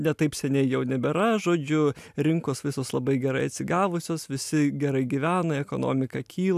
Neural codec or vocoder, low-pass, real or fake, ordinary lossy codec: none; 14.4 kHz; real; AAC, 96 kbps